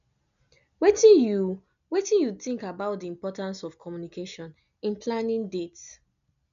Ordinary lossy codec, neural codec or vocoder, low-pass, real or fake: none; none; 7.2 kHz; real